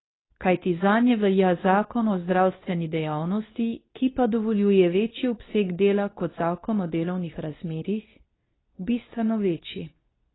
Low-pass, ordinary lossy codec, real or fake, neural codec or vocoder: 7.2 kHz; AAC, 16 kbps; fake; codec, 24 kHz, 0.9 kbps, WavTokenizer, medium speech release version 2